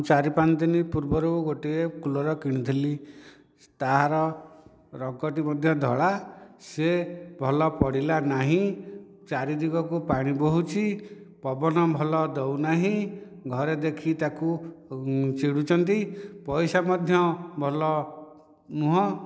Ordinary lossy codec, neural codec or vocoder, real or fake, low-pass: none; none; real; none